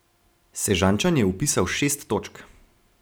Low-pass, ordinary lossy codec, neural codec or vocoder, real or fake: none; none; none; real